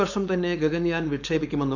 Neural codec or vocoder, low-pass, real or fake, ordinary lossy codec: codec, 16 kHz, 4.8 kbps, FACodec; 7.2 kHz; fake; none